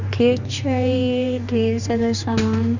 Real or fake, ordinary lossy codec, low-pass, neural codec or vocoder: fake; none; 7.2 kHz; codec, 16 kHz, 2 kbps, X-Codec, HuBERT features, trained on general audio